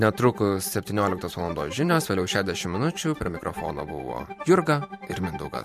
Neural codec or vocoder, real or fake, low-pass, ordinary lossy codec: vocoder, 44.1 kHz, 128 mel bands every 512 samples, BigVGAN v2; fake; 14.4 kHz; MP3, 64 kbps